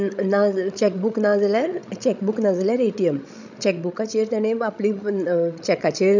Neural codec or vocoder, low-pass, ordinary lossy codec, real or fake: codec, 16 kHz, 16 kbps, FreqCodec, larger model; 7.2 kHz; none; fake